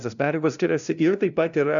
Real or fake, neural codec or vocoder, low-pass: fake; codec, 16 kHz, 0.5 kbps, FunCodec, trained on LibriTTS, 25 frames a second; 7.2 kHz